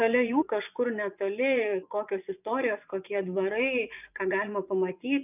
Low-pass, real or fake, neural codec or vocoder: 3.6 kHz; fake; vocoder, 24 kHz, 100 mel bands, Vocos